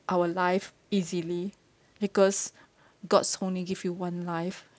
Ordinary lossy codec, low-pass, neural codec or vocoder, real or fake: none; none; none; real